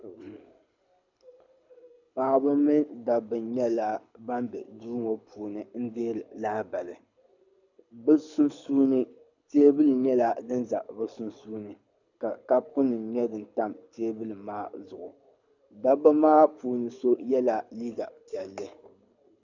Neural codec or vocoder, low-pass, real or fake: codec, 24 kHz, 6 kbps, HILCodec; 7.2 kHz; fake